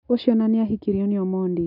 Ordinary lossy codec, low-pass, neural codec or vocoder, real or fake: none; 5.4 kHz; none; real